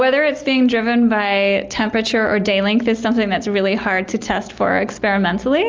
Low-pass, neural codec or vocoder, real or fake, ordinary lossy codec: 7.2 kHz; none; real; Opus, 24 kbps